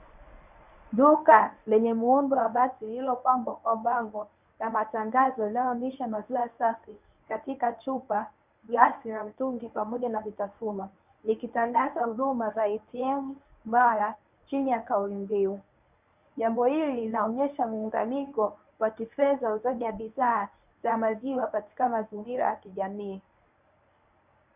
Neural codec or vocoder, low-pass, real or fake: codec, 24 kHz, 0.9 kbps, WavTokenizer, medium speech release version 1; 3.6 kHz; fake